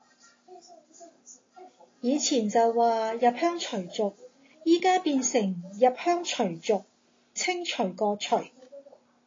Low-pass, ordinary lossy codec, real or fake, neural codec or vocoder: 7.2 kHz; AAC, 32 kbps; real; none